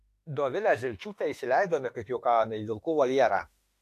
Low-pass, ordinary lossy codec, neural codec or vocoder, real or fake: 14.4 kHz; AAC, 64 kbps; autoencoder, 48 kHz, 32 numbers a frame, DAC-VAE, trained on Japanese speech; fake